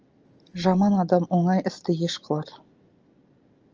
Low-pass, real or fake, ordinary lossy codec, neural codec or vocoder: 7.2 kHz; real; Opus, 24 kbps; none